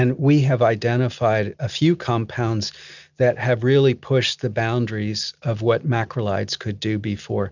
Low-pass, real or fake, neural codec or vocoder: 7.2 kHz; real; none